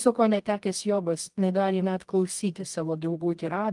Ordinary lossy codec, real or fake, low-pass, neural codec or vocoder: Opus, 32 kbps; fake; 10.8 kHz; codec, 24 kHz, 0.9 kbps, WavTokenizer, medium music audio release